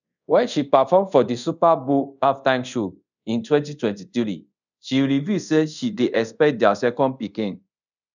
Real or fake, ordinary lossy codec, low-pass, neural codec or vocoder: fake; none; 7.2 kHz; codec, 24 kHz, 0.5 kbps, DualCodec